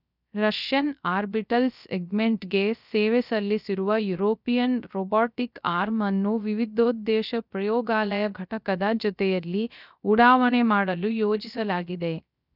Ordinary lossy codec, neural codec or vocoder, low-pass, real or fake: none; codec, 16 kHz, 0.3 kbps, FocalCodec; 5.4 kHz; fake